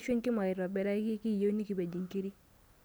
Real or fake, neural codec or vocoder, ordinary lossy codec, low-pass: real; none; none; none